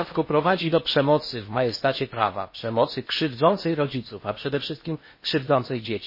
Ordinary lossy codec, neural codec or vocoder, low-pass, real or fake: MP3, 24 kbps; codec, 16 kHz in and 24 kHz out, 0.8 kbps, FocalCodec, streaming, 65536 codes; 5.4 kHz; fake